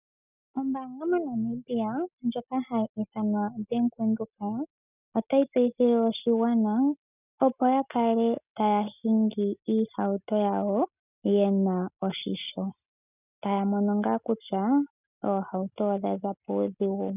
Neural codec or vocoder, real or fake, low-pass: none; real; 3.6 kHz